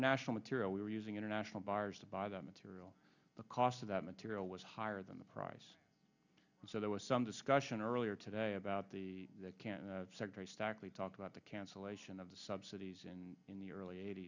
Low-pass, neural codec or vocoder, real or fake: 7.2 kHz; none; real